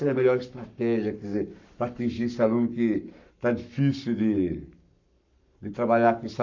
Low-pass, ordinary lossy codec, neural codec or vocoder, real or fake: 7.2 kHz; none; codec, 44.1 kHz, 7.8 kbps, Pupu-Codec; fake